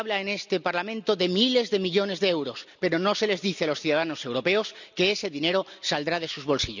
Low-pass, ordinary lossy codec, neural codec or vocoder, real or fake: 7.2 kHz; none; none; real